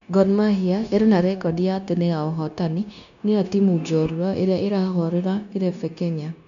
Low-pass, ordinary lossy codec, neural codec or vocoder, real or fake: 7.2 kHz; none; codec, 16 kHz, 0.9 kbps, LongCat-Audio-Codec; fake